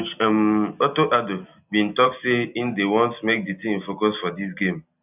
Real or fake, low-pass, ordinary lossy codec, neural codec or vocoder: real; 3.6 kHz; none; none